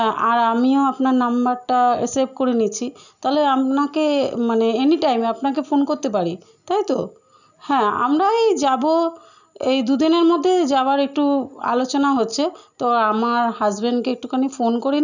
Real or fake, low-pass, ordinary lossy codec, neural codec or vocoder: real; 7.2 kHz; none; none